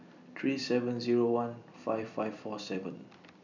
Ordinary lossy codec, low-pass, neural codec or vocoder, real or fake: none; 7.2 kHz; vocoder, 44.1 kHz, 128 mel bands every 256 samples, BigVGAN v2; fake